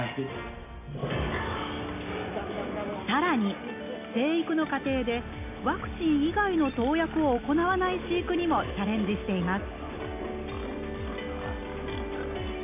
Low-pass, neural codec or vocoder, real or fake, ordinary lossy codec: 3.6 kHz; none; real; none